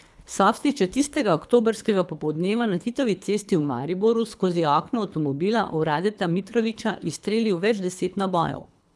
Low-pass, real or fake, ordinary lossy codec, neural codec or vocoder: none; fake; none; codec, 24 kHz, 3 kbps, HILCodec